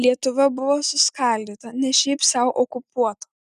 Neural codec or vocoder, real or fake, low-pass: none; real; 14.4 kHz